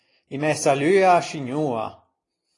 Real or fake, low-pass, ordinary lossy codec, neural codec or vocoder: real; 10.8 kHz; AAC, 32 kbps; none